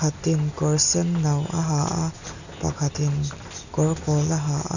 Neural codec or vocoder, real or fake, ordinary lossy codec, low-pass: none; real; none; 7.2 kHz